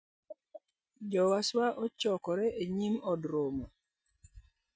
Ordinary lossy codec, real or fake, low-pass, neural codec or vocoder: none; real; none; none